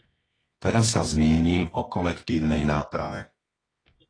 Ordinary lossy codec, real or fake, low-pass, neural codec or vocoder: AAC, 32 kbps; fake; 9.9 kHz; codec, 24 kHz, 0.9 kbps, WavTokenizer, medium music audio release